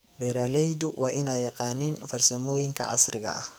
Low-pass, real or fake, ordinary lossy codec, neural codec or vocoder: none; fake; none; codec, 44.1 kHz, 2.6 kbps, SNAC